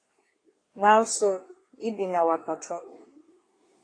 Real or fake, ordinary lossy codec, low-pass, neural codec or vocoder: fake; AAC, 32 kbps; 9.9 kHz; codec, 24 kHz, 1 kbps, SNAC